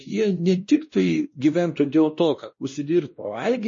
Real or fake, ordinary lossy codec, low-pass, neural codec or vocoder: fake; MP3, 32 kbps; 7.2 kHz; codec, 16 kHz, 1 kbps, X-Codec, WavLM features, trained on Multilingual LibriSpeech